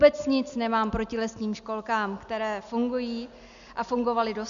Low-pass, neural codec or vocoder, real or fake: 7.2 kHz; none; real